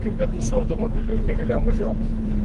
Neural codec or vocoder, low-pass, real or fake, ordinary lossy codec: codec, 24 kHz, 3 kbps, HILCodec; 10.8 kHz; fake; Opus, 24 kbps